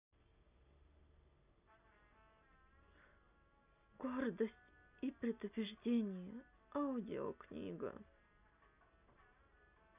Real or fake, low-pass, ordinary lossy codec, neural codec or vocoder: real; 3.6 kHz; none; none